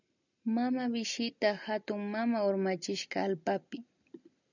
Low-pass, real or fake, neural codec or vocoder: 7.2 kHz; real; none